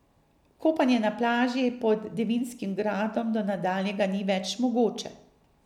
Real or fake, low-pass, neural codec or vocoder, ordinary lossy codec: real; 19.8 kHz; none; none